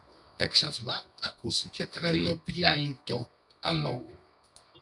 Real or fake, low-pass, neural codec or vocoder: fake; 10.8 kHz; codec, 24 kHz, 0.9 kbps, WavTokenizer, medium music audio release